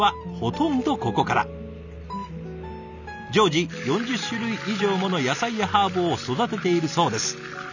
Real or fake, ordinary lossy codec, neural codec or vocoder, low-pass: real; none; none; 7.2 kHz